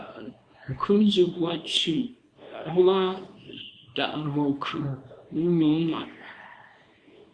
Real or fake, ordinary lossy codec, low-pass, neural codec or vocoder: fake; Opus, 64 kbps; 9.9 kHz; codec, 24 kHz, 0.9 kbps, WavTokenizer, small release